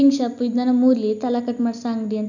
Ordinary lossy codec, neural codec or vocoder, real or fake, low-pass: none; none; real; 7.2 kHz